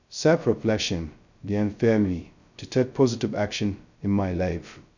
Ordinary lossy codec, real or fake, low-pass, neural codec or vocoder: none; fake; 7.2 kHz; codec, 16 kHz, 0.2 kbps, FocalCodec